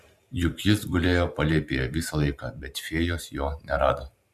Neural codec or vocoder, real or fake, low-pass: vocoder, 44.1 kHz, 128 mel bands every 512 samples, BigVGAN v2; fake; 14.4 kHz